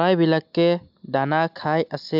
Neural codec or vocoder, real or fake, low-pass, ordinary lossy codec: none; real; 5.4 kHz; AAC, 48 kbps